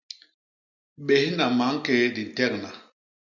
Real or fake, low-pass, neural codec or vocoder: real; 7.2 kHz; none